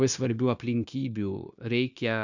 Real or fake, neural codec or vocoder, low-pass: fake; codec, 16 kHz, 0.9 kbps, LongCat-Audio-Codec; 7.2 kHz